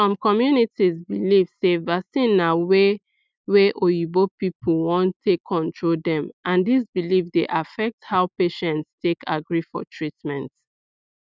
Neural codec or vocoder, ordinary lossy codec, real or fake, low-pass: none; none; real; none